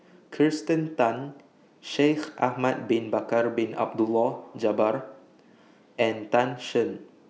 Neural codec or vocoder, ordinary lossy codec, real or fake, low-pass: none; none; real; none